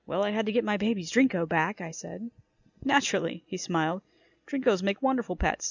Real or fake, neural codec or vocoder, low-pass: real; none; 7.2 kHz